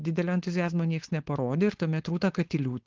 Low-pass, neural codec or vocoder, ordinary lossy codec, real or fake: 7.2 kHz; codec, 16 kHz, 4.8 kbps, FACodec; Opus, 16 kbps; fake